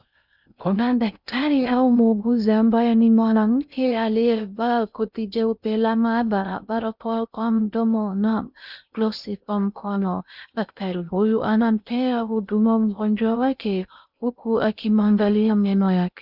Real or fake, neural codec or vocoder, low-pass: fake; codec, 16 kHz in and 24 kHz out, 0.6 kbps, FocalCodec, streaming, 2048 codes; 5.4 kHz